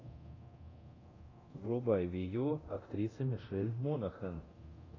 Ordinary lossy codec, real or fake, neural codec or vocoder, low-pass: AAC, 32 kbps; fake; codec, 24 kHz, 0.9 kbps, DualCodec; 7.2 kHz